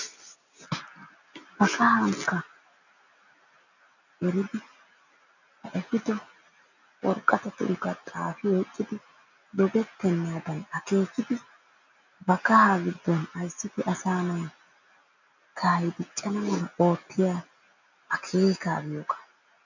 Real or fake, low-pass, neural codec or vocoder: real; 7.2 kHz; none